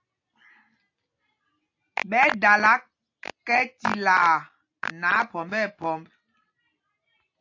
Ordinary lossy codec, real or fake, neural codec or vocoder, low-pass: AAC, 48 kbps; real; none; 7.2 kHz